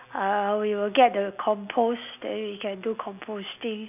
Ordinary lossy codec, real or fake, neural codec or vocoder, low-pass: none; real; none; 3.6 kHz